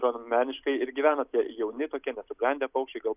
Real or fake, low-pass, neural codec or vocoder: real; 3.6 kHz; none